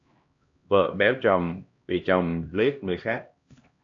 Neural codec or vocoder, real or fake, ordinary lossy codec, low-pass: codec, 16 kHz, 1 kbps, X-Codec, HuBERT features, trained on LibriSpeech; fake; AAC, 48 kbps; 7.2 kHz